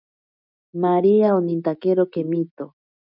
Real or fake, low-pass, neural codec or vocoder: real; 5.4 kHz; none